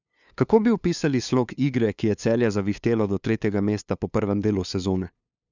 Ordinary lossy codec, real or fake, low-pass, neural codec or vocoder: none; fake; 7.2 kHz; codec, 16 kHz, 2 kbps, FunCodec, trained on LibriTTS, 25 frames a second